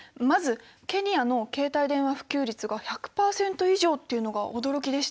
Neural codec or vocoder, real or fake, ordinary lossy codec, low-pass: none; real; none; none